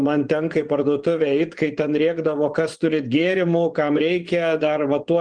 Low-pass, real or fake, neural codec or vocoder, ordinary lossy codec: 9.9 kHz; fake; vocoder, 24 kHz, 100 mel bands, Vocos; Opus, 24 kbps